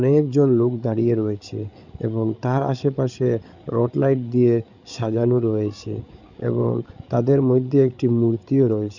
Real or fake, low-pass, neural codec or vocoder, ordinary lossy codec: fake; 7.2 kHz; codec, 16 kHz, 8 kbps, FreqCodec, larger model; none